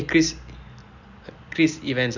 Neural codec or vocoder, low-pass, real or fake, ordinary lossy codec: none; 7.2 kHz; real; none